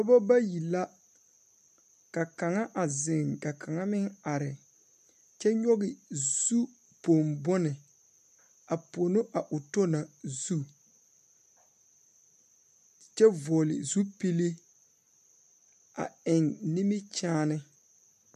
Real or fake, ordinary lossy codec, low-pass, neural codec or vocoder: real; MP3, 96 kbps; 14.4 kHz; none